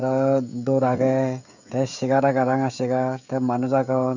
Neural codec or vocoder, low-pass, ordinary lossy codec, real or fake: codec, 16 kHz, 16 kbps, FreqCodec, smaller model; 7.2 kHz; none; fake